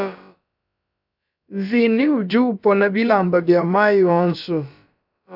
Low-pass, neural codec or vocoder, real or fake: 5.4 kHz; codec, 16 kHz, about 1 kbps, DyCAST, with the encoder's durations; fake